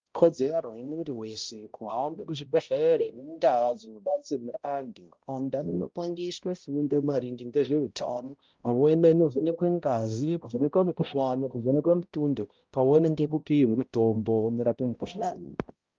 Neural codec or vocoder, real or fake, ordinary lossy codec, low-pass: codec, 16 kHz, 0.5 kbps, X-Codec, HuBERT features, trained on balanced general audio; fake; Opus, 32 kbps; 7.2 kHz